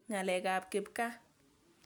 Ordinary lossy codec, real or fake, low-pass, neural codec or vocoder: none; real; none; none